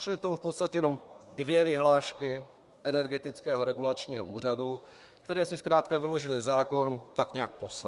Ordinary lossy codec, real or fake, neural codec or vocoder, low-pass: Opus, 64 kbps; fake; codec, 24 kHz, 1 kbps, SNAC; 10.8 kHz